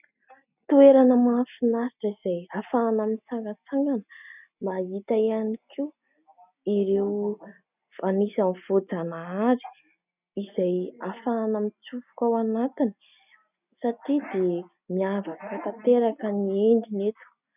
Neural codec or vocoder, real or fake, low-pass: none; real; 3.6 kHz